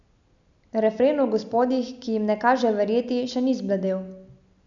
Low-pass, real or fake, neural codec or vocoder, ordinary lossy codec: 7.2 kHz; real; none; none